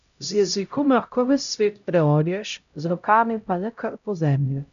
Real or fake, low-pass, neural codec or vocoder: fake; 7.2 kHz; codec, 16 kHz, 0.5 kbps, X-Codec, HuBERT features, trained on LibriSpeech